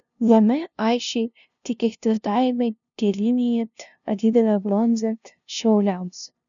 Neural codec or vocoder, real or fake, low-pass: codec, 16 kHz, 0.5 kbps, FunCodec, trained on LibriTTS, 25 frames a second; fake; 7.2 kHz